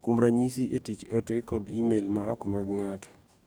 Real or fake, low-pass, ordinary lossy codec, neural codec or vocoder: fake; none; none; codec, 44.1 kHz, 2.6 kbps, DAC